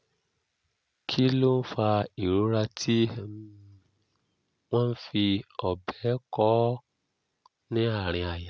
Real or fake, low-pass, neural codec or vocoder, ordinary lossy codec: real; none; none; none